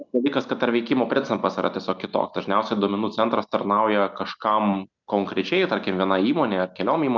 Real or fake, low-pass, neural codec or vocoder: real; 7.2 kHz; none